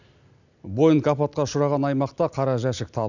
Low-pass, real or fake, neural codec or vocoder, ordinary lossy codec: 7.2 kHz; real; none; none